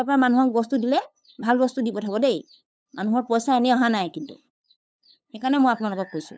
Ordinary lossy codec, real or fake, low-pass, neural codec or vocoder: none; fake; none; codec, 16 kHz, 8 kbps, FunCodec, trained on LibriTTS, 25 frames a second